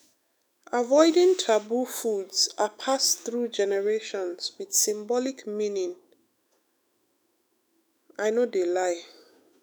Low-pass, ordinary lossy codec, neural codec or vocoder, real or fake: none; none; autoencoder, 48 kHz, 128 numbers a frame, DAC-VAE, trained on Japanese speech; fake